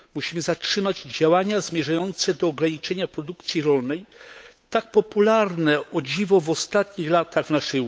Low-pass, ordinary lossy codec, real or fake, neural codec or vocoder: none; none; fake; codec, 16 kHz, 8 kbps, FunCodec, trained on Chinese and English, 25 frames a second